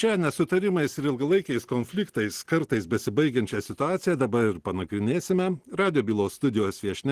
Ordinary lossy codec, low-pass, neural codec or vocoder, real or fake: Opus, 16 kbps; 14.4 kHz; none; real